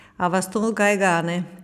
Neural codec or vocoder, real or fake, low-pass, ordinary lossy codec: none; real; 14.4 kHz; none